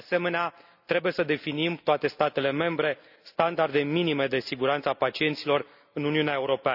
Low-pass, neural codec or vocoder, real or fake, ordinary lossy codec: 5.4 kHz; none; real; none